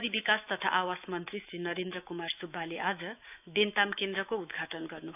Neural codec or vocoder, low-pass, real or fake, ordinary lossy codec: autoencoder, 48 kHz, 128 numbers a frame, DAC-VAE, trained on Japanese speech; 3.6 kHz; fake; none